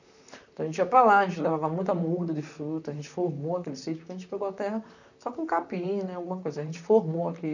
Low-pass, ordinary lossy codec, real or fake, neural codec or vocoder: 7.2 kHz; none; fake; vocoder, 44.1 kHz, 128 mel bands, Pupu-Vocoder